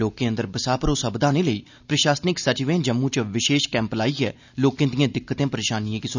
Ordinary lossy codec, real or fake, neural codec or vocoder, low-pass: none; real; none; 7.2 kHz